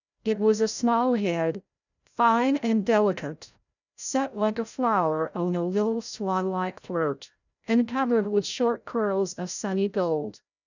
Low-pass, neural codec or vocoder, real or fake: 7.2 kHz; codec, 16 kHz, 0.5 kbps, FreqCodec, larger model; fake